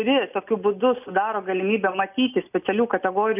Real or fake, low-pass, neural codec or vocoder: real; 3.6 kHz; none